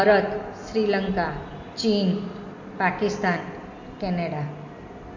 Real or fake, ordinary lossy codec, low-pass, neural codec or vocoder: real; MP3, 48 kbps; 7.2 kHz; none